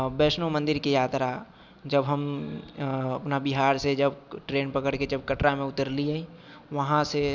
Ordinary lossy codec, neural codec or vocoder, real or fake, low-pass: none; none; real; none